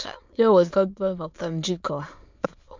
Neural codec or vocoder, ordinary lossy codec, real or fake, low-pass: autoencoder, 22.05 kHz, a latent of 192 numbers a frame, VITS, trained on many speakers; MP3, 64 kbps; fake; 7.2 kHz